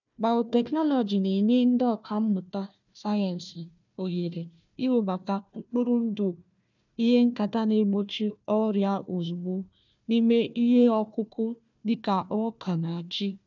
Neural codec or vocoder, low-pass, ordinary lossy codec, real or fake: codec, 16 kHz, 1 kbps, FunCodec, trained on Chinese and English, 50 frames a second; 7.2 kHz; none; fake